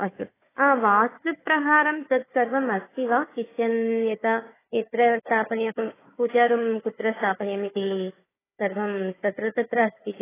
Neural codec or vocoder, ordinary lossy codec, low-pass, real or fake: codec, 16 kHz, 4 kbps, FunCodec, trained on Chinese and English, 50 frames a second; AAC, 16 kbps; 3.6 kHz; fake